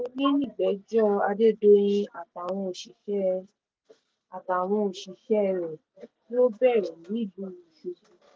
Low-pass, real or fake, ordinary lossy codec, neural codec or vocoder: none; real; none; none